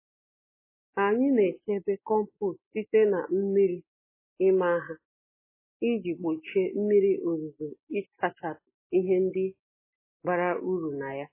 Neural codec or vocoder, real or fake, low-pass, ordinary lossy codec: none; real; 3.6 kHz; MP3, 16 kbps